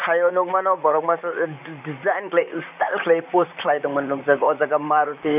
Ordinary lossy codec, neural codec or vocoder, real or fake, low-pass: none; vocoder, 44.1 kHz, 128 mel bands, Pupu-Vocoder; fake; 3.6 kHz